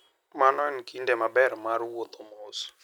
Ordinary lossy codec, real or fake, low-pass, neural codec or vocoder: none; real; none; none